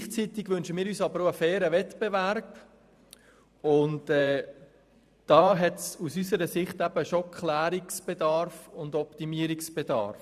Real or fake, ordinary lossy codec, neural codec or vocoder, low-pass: fake; none; vocoder, 44.1 kHz, 128 mel bands every 512 samples, BigVGAN v2; 14.4 kHz